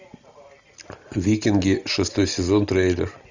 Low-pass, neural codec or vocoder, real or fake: 7.2 kHz; none; real